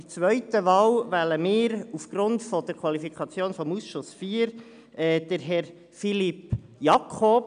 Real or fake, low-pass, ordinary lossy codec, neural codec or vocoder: real; 9.9 kHz; none; none